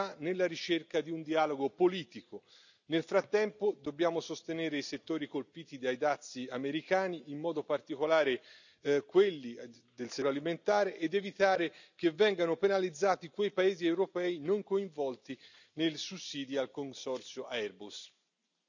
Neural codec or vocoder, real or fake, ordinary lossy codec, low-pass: none; real; none; 7.2 kHz